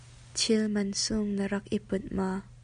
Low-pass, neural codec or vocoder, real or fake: 9.9 kHz; none; real